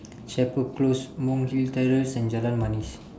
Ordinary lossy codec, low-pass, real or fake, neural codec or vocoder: none; none; real; none